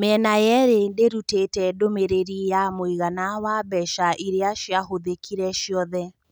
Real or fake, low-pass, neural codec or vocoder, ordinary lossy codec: real; none; none; none